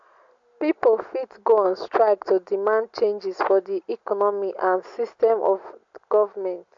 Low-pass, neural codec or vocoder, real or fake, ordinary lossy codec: 7.2 kHz; none; real; MP3, 48 kbps